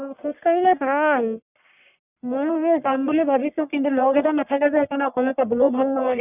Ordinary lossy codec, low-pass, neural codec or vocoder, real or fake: none; 3.6 kHz; codec, 44.1 kHz, 1.7 kbps, Pupu-Codec; fake